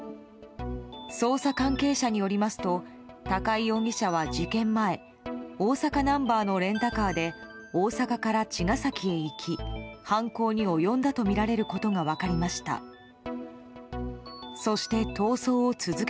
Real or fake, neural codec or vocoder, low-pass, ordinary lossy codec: real; none; none; none